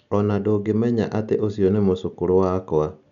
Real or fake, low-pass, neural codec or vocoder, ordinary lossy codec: real; 7.2 kHz; none; none